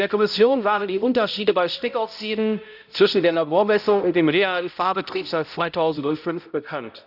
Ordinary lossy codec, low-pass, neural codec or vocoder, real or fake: none; 5.4 kHz; codec, 16 kHz, 0.5 kbps, X-Codec, HuBERT features, trained on balanced general audio; fake